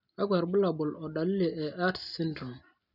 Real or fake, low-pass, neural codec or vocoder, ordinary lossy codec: real; 5.4 kHz; none; none